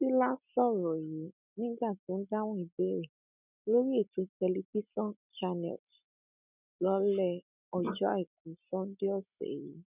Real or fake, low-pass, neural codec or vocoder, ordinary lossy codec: real; 3.6 kHz; none; none